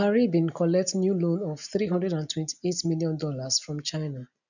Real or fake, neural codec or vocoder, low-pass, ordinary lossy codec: real; none; 7.2 kHz; none